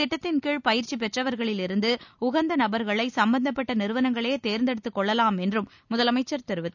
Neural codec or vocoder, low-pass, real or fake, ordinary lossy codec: none; 7.2 kHz; real; none